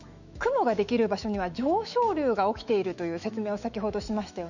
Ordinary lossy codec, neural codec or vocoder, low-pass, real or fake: none; autoencoder, 48 kHz, 128 numbers a frame, DAC-VAE, trained on Japanese speech; 7.2 kHz; fake